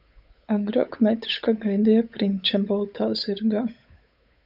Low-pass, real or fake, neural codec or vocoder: 5.4 kHz; fake; codec, 16 kHz, 8 kbps, FunCodec, trained on Chinese and English, 25 frames a second